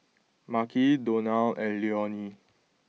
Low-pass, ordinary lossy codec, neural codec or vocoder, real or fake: none; none; none; real